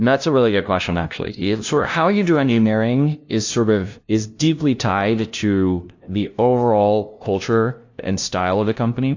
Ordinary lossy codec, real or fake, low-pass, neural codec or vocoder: AAC, 48 kbps; fake; 7.2 kHz; codec, 16 kHz, 0.5 kbps, FunCodec, trained on LibriTTS, 25 frames a second